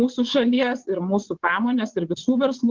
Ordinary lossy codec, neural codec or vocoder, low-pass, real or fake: Opus, 16 kbps; vocoder, 22.05 kHz, 80 mel bands, WaveNeXt; 7.2 kHz; fake